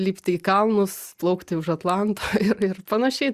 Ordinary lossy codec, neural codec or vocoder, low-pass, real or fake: Opus, 64 kbps; none; 14.4 kHz; real